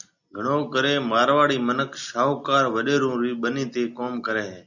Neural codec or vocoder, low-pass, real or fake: none; 7.2 kHz; real